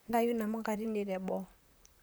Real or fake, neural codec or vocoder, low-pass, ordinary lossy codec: fake; vocoder, 44.1 kHz, 128 mel bands, Pupu-Vocoder; none; none